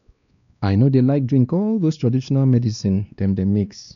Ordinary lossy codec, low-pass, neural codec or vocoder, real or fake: none; 7.2 kHz; codec, 16 kHz, 2 kbps, X-Codec, WavLM features, trained on Multilingual LibriSpeech; fake